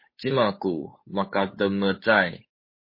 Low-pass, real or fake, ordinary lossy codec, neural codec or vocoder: 5.4 kHz; fake; MP3, 24 kbps; codec, 16 kHz, 8 kbps, FunCodec, trained on Chinese and English, 25 frames a second